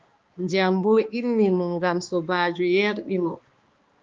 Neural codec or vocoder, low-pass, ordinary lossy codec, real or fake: codec, 16 kHz, 2 kbps, X-Codec, HuBERT features, trained on balanced general audio; 7.2 kHz; Opus, 32 kbps; fake